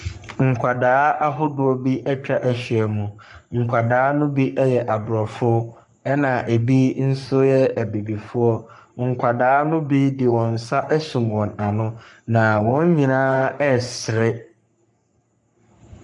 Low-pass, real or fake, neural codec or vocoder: 10.8 kHz; fake; codec, 44.1 kHz, 3.4 kbps, Pupu-Codec